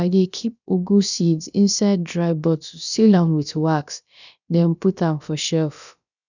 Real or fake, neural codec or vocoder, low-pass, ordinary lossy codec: fake; codec, 16 kHz, about 1 kbps, DyCAST, with the encoder's durations; 7.2 kHz; none